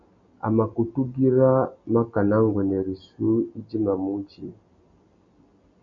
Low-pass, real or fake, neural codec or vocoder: 7.2 kHz; real; none